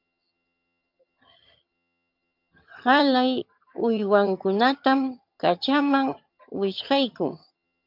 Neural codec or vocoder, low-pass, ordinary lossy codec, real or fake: vocoder, 22.05 kHz, 80 mel bands, HiFi-GAN; 5.4 kHz; MP3, 48 kbps; fake